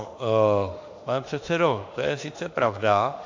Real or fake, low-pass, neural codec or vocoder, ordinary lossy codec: fake; 7.2 kHz; autoencoder, 48 kHz, 32 numbers a frame, DAC-VAE, trained on Japanese speech; AAC, 48 kbps